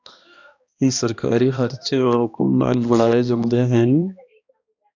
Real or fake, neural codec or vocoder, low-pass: fake; codec, 16 kHz, 1 kbps, X-Codec, HuBERT features, trained on balanced general audio; 7.2 kHz